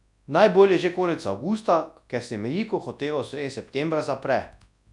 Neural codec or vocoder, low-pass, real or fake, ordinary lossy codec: codec, 24 kHz, 0.9 kbps, WavTokenizer, large speech release; 10.8 kHz; fake; none